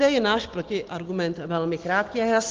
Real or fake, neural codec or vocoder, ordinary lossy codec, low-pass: real; none; Opus, 16 kbps; 7.2 kHz